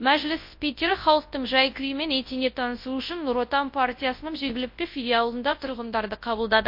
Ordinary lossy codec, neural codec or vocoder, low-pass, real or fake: MP3, 32 kbps; codec, 24 kHz, 0.9 kbps, WavTokenizer, large speech release; 5.4 kHz; fake